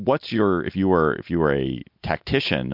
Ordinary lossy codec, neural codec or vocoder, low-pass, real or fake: MP3, 48 kbps; none; 5.4 kHz; real